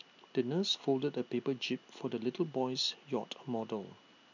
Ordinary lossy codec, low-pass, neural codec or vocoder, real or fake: none; 7.2 kHz; none; real